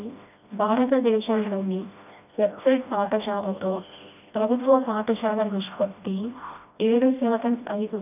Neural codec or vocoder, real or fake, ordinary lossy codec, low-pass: codec, 16 kHz, 1 kbps, FreqCodec, smaller model; fake; none; 3.6 kHz